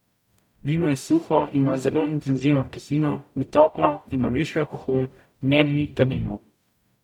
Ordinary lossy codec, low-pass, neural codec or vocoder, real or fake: none; 19.8 kHz; codec, 44.1 kHz, 0.9 kbps, DAC; fake